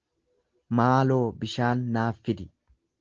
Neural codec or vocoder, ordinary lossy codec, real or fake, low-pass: none; Opus, 16 kbps; real; 7.2 kHz